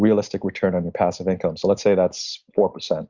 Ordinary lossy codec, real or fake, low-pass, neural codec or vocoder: Opus, 64 kbps; real; 7.2 kHz; none